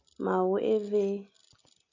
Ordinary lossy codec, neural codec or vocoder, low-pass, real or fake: MP3, 48 kbps; none; 7.2 kHz; real